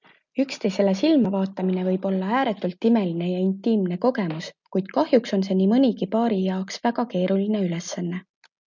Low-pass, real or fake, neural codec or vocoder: 7.2 kHz; real; none